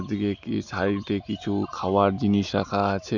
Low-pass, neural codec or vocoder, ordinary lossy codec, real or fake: 7.2 kHz; none; none; real